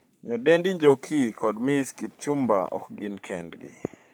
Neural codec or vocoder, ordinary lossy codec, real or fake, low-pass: codec, 44.1 kHz, 3.4 kbps, Pupu-Codec; none; fake; none